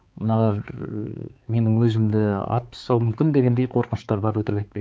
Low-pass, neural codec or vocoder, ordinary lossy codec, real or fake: none; codec, 16 kHz, 4 kbps, X-Codec, HuBERT features, trained on balanced general audio; none; fake